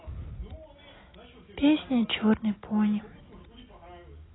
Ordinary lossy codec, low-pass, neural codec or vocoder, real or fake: AAC, 16 kbps; 7.2 kHz; none; real